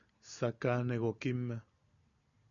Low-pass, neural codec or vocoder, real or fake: 7.2 kHz; none; real